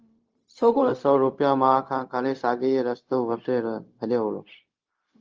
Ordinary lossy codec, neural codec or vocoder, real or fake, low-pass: Opus, 24 kbps; codec, 16 kHz, 0.4 kbps, LongCat-Audio-Codec; fake; 7.2 kHz